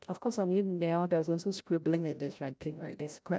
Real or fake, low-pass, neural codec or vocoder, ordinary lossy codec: fake; none; codec, 16 kHz, 0.5 kbps, FreqCodec, larger model; none